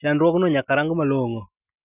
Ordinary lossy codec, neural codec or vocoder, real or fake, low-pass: none; none; real; 3.6 kHz